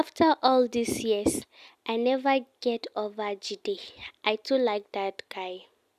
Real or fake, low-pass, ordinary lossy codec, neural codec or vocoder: real; 14.4 kHz; none; none